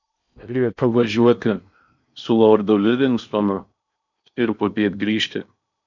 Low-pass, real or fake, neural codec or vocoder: 7.2 kHz; fake; codec, 16 kHz in and 24 kHz out, 0.8 kbps, FocalCodec, streaming, 65536 codes